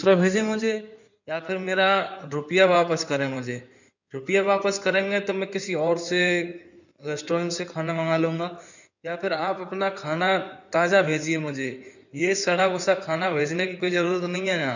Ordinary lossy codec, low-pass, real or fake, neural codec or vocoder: none; 7.2 kHz; fake; codec, 16 kHz in and 24 kHz out, 2.2 kbps, FireRedTTS-2 codec